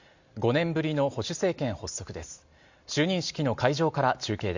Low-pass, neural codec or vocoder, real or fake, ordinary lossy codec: 7.2 kHz; none; real; Opus, 64 kbps